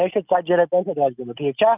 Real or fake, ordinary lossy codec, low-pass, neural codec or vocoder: real; none; 3.6 kHz; none